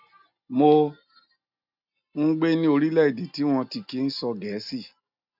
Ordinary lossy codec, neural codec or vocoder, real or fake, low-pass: none; none; real; 5.4 kHz